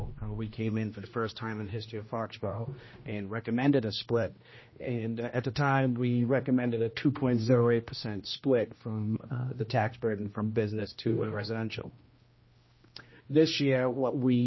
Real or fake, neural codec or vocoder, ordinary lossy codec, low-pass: fake; codec, 16 kHz, 1 kbps, X-Codec, HuBERT features, trained on general audio; MP3, 24 kbps; 7.2 kHz